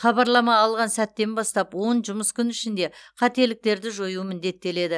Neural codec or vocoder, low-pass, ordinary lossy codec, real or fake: none; none; none; real